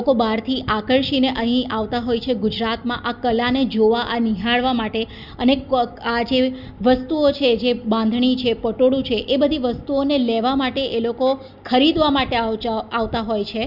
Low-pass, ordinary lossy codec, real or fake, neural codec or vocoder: 5.4 kHz; Opus, 64 kbps; real; none